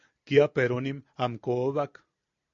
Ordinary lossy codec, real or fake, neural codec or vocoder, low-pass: MP3, 48 kbps; real; none; 7.2 kHz